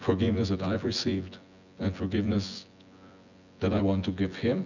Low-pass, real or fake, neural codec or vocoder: 7.2 kHz; fake; vocoder, 24 kHz, 100 mel bands, Vocos